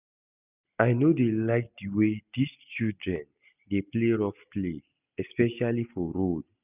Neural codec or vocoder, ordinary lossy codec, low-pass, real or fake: none; none; 3.6 kHz; real